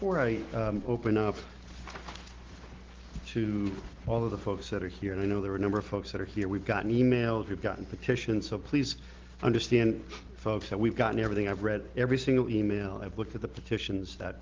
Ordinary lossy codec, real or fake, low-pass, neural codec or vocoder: Opus, 16 kbps; real; 7.2 kHz; none